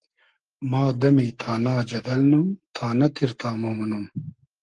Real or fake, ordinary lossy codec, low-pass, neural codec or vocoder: fake; Opus, 24 kbps; 10.8 kHz; codec, 44.1 kHz, 7.8 kbps, Pupu-Codec